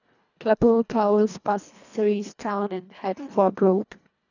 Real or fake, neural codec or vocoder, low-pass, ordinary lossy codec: fake; codec, 24 kHz, 1.5 kbps, HILCodec; 7.2 kHz; none